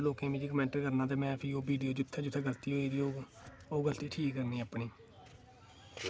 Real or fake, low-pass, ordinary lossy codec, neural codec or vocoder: real; none; none; none